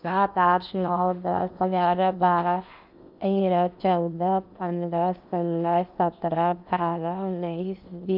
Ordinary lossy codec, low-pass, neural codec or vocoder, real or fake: none; 5.4 kHz; codec, 16 kHz in and 24 kHz out, 0.8 kbps, FocalCodec, streaming, 65536 codes; fake